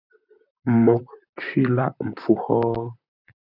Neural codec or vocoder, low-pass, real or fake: vocoder, 44.1 kHz, 128 mel bands, Pupu-Vocoder; 5.4 kHz; fake